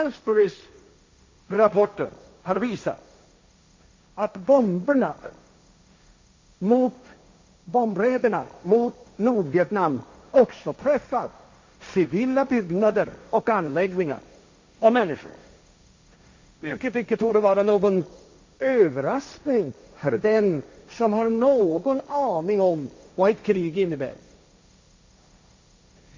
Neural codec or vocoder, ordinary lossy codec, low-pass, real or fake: codec, 16 kHz, 1.1 kbps, Voila-Tokenizer; MP3, 48 kbps; 7.2 kHz; fake